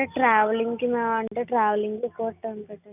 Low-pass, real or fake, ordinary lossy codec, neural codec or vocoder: 3.6 kHz; real; none; none